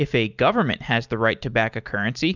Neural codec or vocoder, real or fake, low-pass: none; real; 7.2 kHz